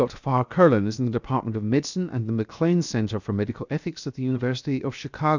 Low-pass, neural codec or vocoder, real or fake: 7.2 kHz; codec, 16 kHz, 0.7 kbps, FocalCodec; fake